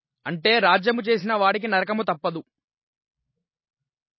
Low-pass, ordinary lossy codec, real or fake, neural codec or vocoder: 7.2 kHz; MP3, 24 kbps; real; none